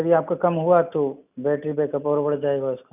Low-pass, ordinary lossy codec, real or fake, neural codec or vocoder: 3.6 kHz; none; real; none